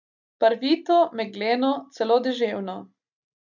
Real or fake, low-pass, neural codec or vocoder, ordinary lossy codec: real; 7.2 kHz; none; none